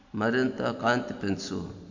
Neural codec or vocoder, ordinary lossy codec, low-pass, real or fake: none; AAC, 48 kbps; 7.2 kHz; real